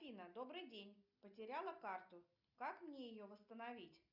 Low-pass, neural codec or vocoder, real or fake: 5.4 kHz; none; real